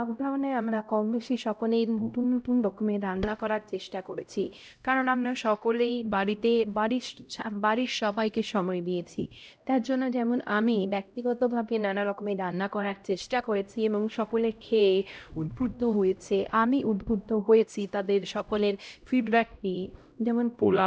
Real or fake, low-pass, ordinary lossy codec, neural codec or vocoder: fake; none; none; codec, 16 kHz, 0.5 kbps, X-Codec, HuBERT features, trained on LibriSpeech